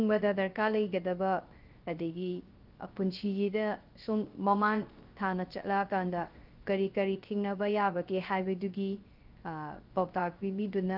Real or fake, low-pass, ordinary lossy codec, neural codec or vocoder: fake; 5.4 kHz; Opus, 32 kbps; codec, 16 kHz, 0.3 kbps, FocalCodec